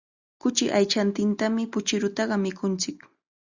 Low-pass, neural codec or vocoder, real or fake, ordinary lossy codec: 7.2 kHz; none; real; Opus, 64 kbps